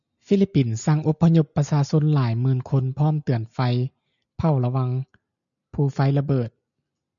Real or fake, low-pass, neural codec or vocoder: real; 7.2 kHz; none